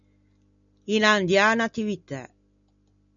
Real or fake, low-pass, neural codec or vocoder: real; 7.2 kHz; none